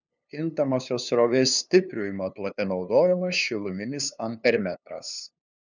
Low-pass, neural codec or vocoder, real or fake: 7.2 kHz; codec, 16 kHz, 2 kbps, FunCodec, trained on LibriTTS, 25 frames a second; fake